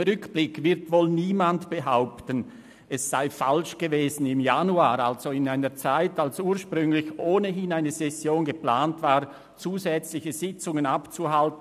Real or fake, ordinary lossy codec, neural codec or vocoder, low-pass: real; none; none; 14.4 kHz